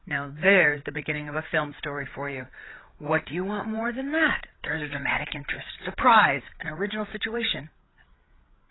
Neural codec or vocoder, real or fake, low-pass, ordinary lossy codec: codec, 16 kHz, 8 kbps, FreqCodec, larger model; fake; 7.2 kHz; AAC, 16 kbps